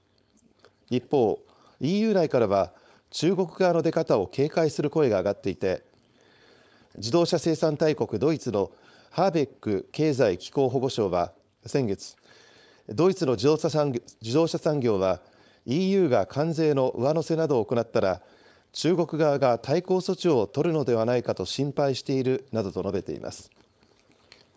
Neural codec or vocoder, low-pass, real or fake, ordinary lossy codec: codec, 16 kHz, 4.8 kbps, FACodec; none; fake; none